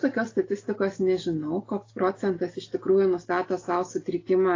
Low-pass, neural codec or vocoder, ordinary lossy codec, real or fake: 7.2 kHz; none; AAC, 32 kbps; real